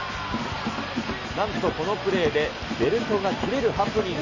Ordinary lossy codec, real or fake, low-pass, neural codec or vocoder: none; real; 7.2 kHz; none